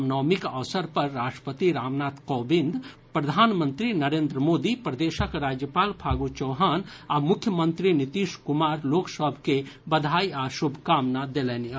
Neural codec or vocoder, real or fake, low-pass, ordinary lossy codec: none; real; none; none